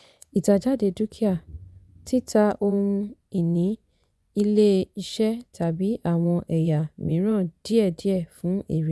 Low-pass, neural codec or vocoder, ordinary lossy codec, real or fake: none; vocoder, 24 kHz, 100 mel bands, Vocos; none; fake